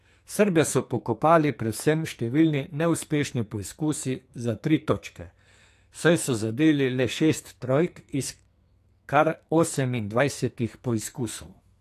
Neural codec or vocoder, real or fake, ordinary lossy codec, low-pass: codec, 44.1 kHz, 2.6 kbps, SNAC; fake; AAC, 64 kbps; 14.4 kHz